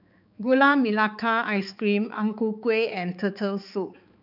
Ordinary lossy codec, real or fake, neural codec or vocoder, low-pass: none; fake; codec, 16 kHz, 4 kbps, X-Codec, HuBERT features, trained on balanced general audio; 5.4 kHz